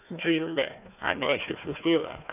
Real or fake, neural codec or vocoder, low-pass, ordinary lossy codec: fake; codec, 16 kHz, 1 kbps, FunCodec, trained on Chinese and English, 50 frames a second; 3.6 kHz; none